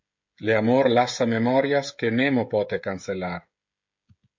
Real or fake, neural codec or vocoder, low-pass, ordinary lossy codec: fake; codec, 16 kHz, 16 kbps, FreqCodec, smaller model; 7.2 kHz; MP3, 48 kbps